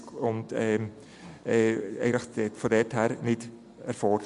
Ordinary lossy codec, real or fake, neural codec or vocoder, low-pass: MP3, 64 kbps; real; none; 10.8 kHz